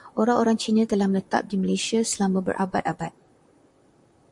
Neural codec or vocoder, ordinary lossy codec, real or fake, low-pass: vocoder, 44.1 kHz, 128 mel bands, Pupu-Vocoder; MP3, 64 kbps; fake; 10.8 kHz